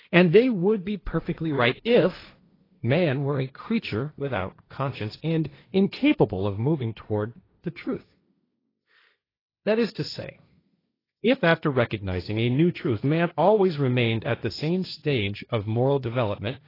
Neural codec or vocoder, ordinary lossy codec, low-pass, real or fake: codec, 16 kHz, 1.1 kbps, Voila-Tokenizer; AAC, 24 kbps; 5.4 kHz; fake